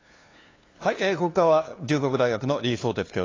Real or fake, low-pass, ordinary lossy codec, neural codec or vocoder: fake; 7.2 kHz; AAC, 32 kbps; codec, 16 kHz, 2 kbps, FunCodec, trained on LibriTTS, 25 frames a second